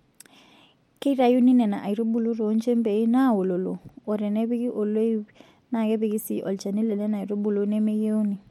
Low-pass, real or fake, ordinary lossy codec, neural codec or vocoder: 19.8 kHz; real; MP3, 64 kbps; none